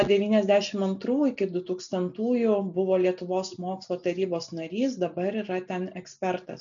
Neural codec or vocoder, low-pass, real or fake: none; 7.2 kHz; real